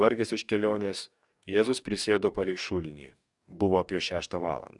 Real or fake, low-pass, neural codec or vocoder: fake; 10.8 kHz; codec, 44.1 kHz, 2.6 kbps, DAC